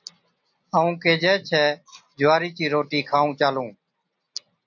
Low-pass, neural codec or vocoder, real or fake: 7.2 kHz; none; real